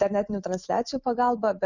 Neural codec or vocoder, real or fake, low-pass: none; real; 7.2 kHz